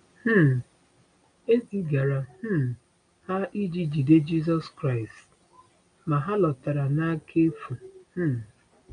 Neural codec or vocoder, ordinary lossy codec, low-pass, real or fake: none; MP3, 64 kbps; 9.9 kHz; real